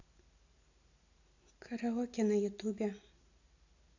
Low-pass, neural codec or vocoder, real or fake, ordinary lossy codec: 7.2 kHz; none; real; AAC, 48 kbps